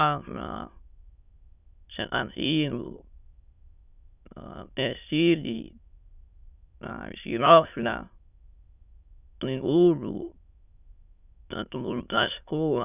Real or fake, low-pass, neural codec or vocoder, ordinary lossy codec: fake; 3.6 kHz; autoencoder, 22.05 kHz, a latent of 192 numbers a frame, VITS, trained on many speakers; none